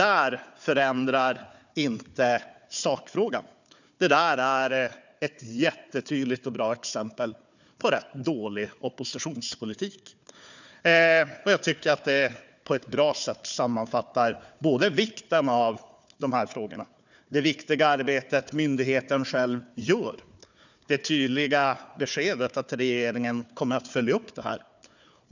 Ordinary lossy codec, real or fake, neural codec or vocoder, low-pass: none; fake; codec, 16 kHz, 4 kbps, FunCodec, trained on Chinese and English, 50 frames a second; 7.2 kHz